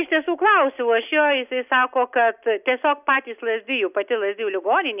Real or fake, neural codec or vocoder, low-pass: real; none; 3.6 kHz